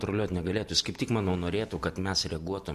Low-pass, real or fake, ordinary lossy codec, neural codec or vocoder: 14.4 kHz; real; MP3, 64 kbps; none